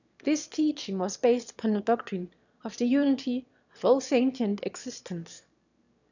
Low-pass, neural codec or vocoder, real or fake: 7.2 kHz; autoencoder, 22.05 kHz, a latent of 192 numbers a frame, VITS, trained on one speaker; fake